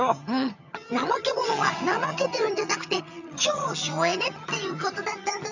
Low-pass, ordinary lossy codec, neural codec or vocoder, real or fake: 7.2 kHz; none; vocoder, 22.05 kHz, 80 mel bands, HiFi-GAN; fake